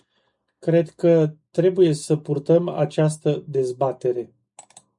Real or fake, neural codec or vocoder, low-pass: real; none; 10.8 kHz